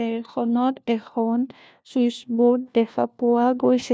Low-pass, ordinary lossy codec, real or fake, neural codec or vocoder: none; none; fake; codec, 16 kHz, 1 kbps, FunCodec, trained on LibriTTS, 50 frames a second